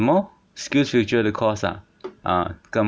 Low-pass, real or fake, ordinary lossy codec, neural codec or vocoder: none; real; none; none